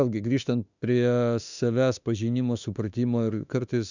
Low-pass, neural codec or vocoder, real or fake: 7.2 kHz; autoencoder, 48 kHz, 32 numbers a frame, DAC-VAE, trained on Japanese speech; fake